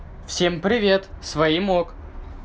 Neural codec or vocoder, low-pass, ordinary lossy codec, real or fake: none; none; none; real